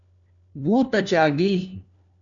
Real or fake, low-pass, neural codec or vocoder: fake; 7.2 kHz; codec, 16 kHz, 1 kbps, FunCodec, trained on LibriTTS, 50 frames a second